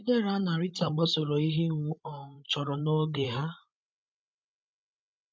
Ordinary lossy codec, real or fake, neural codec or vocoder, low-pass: none; fake; codec, 16 kHz, 16 kbps, FreqCodec, larger model; none